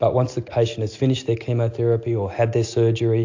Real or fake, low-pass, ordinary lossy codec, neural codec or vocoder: real; 7.2 kHz; AAC, 48 kbps; none